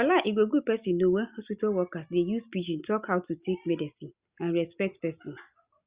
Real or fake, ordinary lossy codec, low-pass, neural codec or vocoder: real; Opus, 64 kbps; 3.6 kHz; none